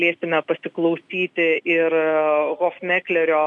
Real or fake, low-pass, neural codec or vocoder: real; 10.8 kHz; none